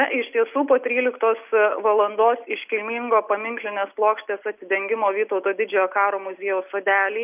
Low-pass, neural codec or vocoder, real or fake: 3.6 kHz; none; real